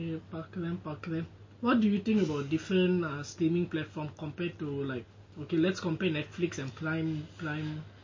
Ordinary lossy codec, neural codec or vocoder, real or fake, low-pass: MP3, 32 kbps; none; real; 7.2 kHz